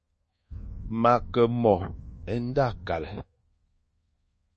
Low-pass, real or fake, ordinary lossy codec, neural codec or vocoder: 10.8 kHz; fake; MP3, 32 kbps; codec, 24 kHz, 1.2 kbps, DualCodec